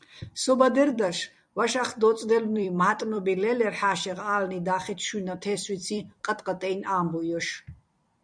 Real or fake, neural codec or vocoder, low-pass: fake; vocoder, 44.1 kHz, 128 mel bands every 256 samples, BigVGAN v2; 9.9 kHz